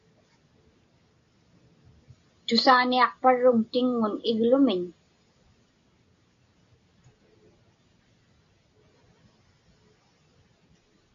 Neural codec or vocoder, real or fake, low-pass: none; real; 7.2 kHz